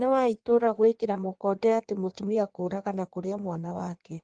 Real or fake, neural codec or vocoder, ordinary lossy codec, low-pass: fake; codec, 16 kHz in and 24 kHz out, 1.1 kbps, FireRedTTS-2 codec; Opus, 24 kbps; 9.9 kHz